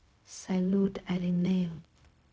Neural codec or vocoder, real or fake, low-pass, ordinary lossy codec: codec, 16 kHz, 0.4 kbps, LongCat-Audio-Codec; fake; none; none